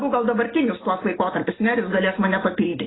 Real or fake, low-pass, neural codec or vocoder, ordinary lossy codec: real; 7.2 kHz; none; AAC, 16 kbps